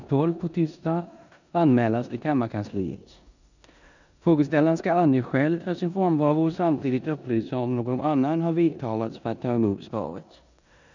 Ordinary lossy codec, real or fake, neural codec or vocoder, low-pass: none; fake; codec, 16 kHz in and 24 kHz out, 0.9 kbps, LongCat-Audio-Codec, four codebook decoder; 7.2 kHz